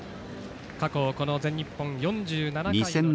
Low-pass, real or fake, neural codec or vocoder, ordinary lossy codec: none; real; none; none